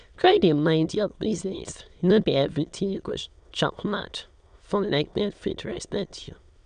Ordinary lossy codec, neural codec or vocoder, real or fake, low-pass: none; autoencoder, 22.05 kHz, a latent of 192 numbers a frame, VITS, trained on many speakers; fake; 9.9 kHz